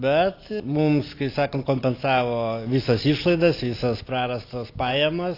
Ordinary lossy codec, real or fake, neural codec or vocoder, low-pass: AAC, 32 kbps; real; none; 5.4 kHz